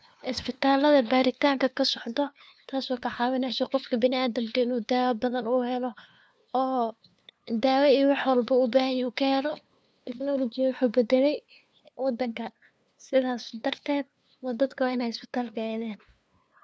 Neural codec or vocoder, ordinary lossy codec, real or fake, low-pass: codec, 16 kHz, 2 kbps, FunCodec, trained on LibriTTS, 25 frames a second; none; fake; none